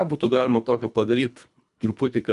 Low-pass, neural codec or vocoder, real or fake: 10.8 kHz; codec, 24 kHz, 1.5 kbps, HILCodec; fake